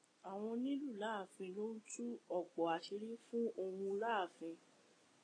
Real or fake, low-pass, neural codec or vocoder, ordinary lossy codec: real; 9.9 kHz; none; AAC, 32 kbps